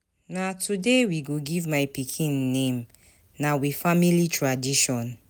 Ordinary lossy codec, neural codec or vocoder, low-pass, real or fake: none; none; none; real